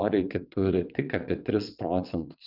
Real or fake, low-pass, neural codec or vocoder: fake; 5.4 kHz; vocoder, 22.05 kHz, 80 mel bands, WaveNeXt